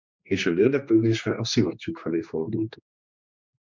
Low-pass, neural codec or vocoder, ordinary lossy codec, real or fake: 7.2 kHz; codec, 16 kHz, 2 kbps, X-Codec, HuBERT features, trained on general audio; MP3, 64 kbps; fake